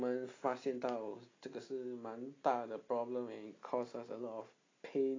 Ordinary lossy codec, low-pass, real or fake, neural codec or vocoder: AAC, 32 kbps; 7.2 kHz; real; none